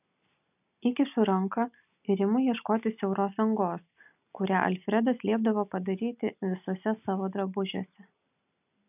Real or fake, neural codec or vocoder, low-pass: real; none; 3.6 kHz